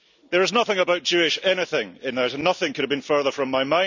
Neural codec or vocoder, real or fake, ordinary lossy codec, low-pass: none; real; none; 7.2 kHz